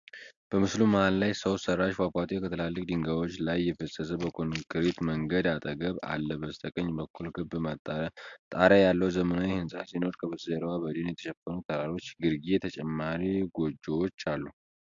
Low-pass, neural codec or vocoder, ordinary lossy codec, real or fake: 7.2 kHz; none; AAC, 64 kbps; real